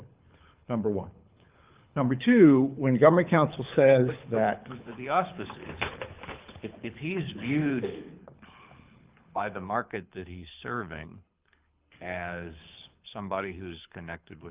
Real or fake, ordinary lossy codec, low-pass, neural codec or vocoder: fake; Opus, 24 kbps; 3.6 kHz; codec, 24 kHz, 6 kbps, HILCodec